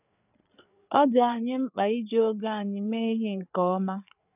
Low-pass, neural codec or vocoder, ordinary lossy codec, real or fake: 3.6 kHz; codec, 16 kHz, 4 kbps, FreqCodec, larger model; none; fake